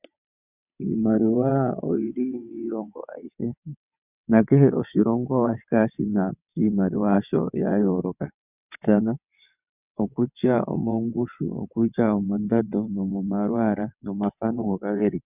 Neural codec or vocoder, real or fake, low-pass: vocoder, 22.05 kHz, 80 mel bands, WaveNeXt; fake; 3.6 kHz